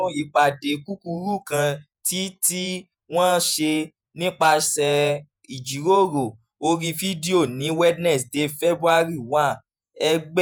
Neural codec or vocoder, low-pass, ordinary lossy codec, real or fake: vocoder, 48 kHz, 128 mel bands, Vocos; none; none; fake